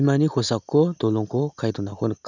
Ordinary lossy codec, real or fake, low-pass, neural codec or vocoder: none; real; 7.2 kHz; none